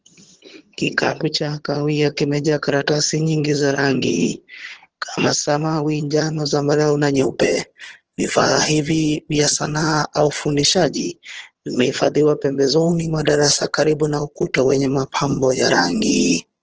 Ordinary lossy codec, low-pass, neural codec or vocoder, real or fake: Opus, 16 kbps; 7.2 kHz; vocoder, 22.05 kHz, 80 mel bands, HiFi-GAN; fake